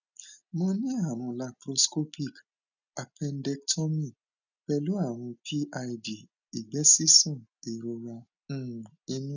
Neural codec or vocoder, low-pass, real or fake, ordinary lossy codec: none; 7.2 kHz; real; none